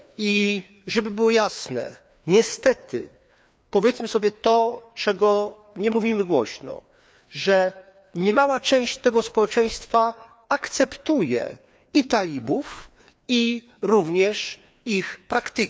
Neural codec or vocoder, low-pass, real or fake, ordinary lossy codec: codec, 16 kHz, 2 kbps, FreqCodec, larger model; none; fake; none